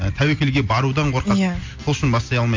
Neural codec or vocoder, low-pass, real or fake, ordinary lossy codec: none; 7.2 kHz; real; MP3, 48 kbps